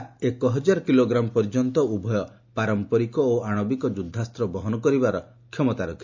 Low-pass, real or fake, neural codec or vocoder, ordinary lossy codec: 7.2 kHz; real; none; MP3, 48 kbps